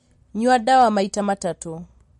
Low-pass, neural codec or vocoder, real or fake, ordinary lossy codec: 19.8 kHz; none; real; MP3, 48 kbps